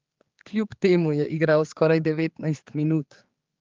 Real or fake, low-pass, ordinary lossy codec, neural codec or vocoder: fake; 7.2 kHz; Opus, 32 kbps; codec, 16 kHz, 4 kbps, X-Codec, HuBERT features, trained on general audio